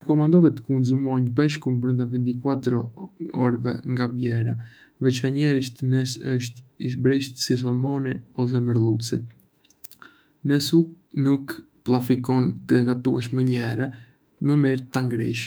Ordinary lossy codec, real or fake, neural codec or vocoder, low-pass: none; fake; autoencoder, 48 kHz, 32 numbers a frame, DAC-VAE, trained on Japanese speech; none